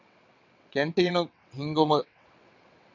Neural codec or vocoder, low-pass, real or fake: vocoder, 22.05 kHz, 80 mel bands, WaveNeXt; 7.2 kHz; fake